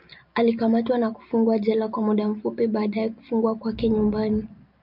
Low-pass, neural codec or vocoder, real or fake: 5.4 kHz; none; real